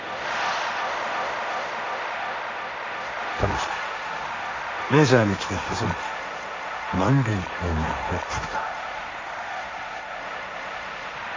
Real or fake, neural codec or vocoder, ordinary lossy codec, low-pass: fake; codec, 16 kHz, 1.1 kbps, Voila-Tokenizer; MP3, 48 kbps; 7.2 kHz